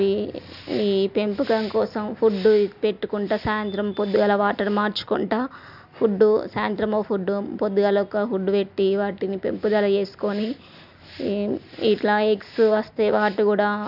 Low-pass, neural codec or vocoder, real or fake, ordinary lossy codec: 5.4 kHz; none; real; none